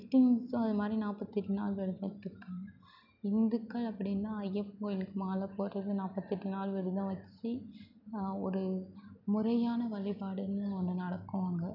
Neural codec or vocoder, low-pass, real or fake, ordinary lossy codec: none; 5.4 kHz; real; none